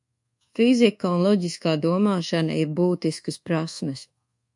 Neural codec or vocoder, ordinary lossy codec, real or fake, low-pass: codec, 24 kHz, 1.2 kbps, DualCodec; MP3, 64 kbps; fake; 10.8 kHz